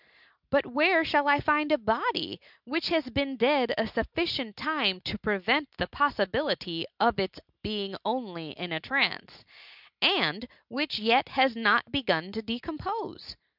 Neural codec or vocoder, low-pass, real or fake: none; 5.4 kHz; real